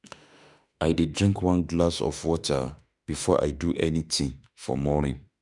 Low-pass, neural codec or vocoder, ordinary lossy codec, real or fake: 10.8 kHz; autoencoder, 48 kHz, 32 numbers a frame, DAC-VAE, trained on Japanese speech; none; fake